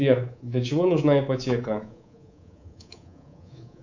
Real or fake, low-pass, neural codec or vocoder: fake; 7.2 kHz; codec, 24 kHz, 3.1 kbps, DualCodec